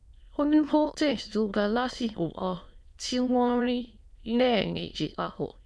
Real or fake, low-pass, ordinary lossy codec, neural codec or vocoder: fake; none; none; autoencoder, 22.05 kHz, a latent of 192 numbers a frame, VITS, trained on many speakers